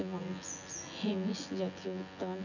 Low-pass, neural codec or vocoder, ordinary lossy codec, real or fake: 7.2 kHz; vocoder, 24 kHz, 100 mel bands, Vocos; none; fake